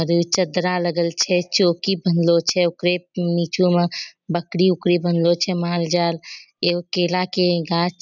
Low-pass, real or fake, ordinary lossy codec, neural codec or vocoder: 7.2 kHz; real; none; none